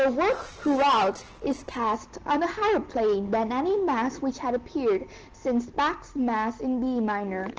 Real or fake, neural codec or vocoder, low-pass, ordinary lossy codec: real; none; 7.2 kHz; Opus, 16 kbps